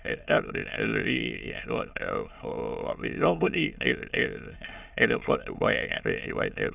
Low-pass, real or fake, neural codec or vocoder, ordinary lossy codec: 3.6 kHz; fake; autoencoder, 22.05 kHz, a latent of 192 numbers a frame, VITS, trained on many speakers; none